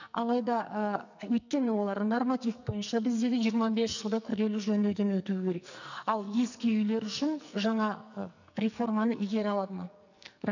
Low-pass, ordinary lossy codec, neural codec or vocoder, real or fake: 7.2 kHz; none; codec, 44.1 kHz, 2.6 kbps, SNAC; fake